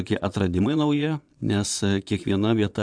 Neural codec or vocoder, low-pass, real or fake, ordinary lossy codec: vocoder, 22.05 kHz, 80 mel bands, Vocos; 9.9 kHz; fake; MP3, 96 kbps